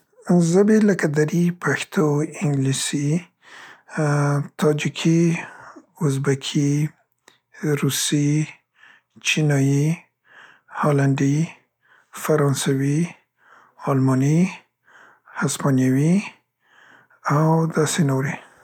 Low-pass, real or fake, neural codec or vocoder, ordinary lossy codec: 19.8 kHz; real; none; none